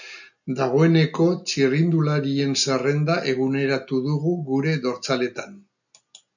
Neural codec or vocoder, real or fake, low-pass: none; real; 7.2 kHz